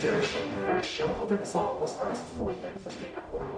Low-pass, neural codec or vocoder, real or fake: 9.9 kHz; codec, 44.1 kHz, 0.9 kbps, DAC; fake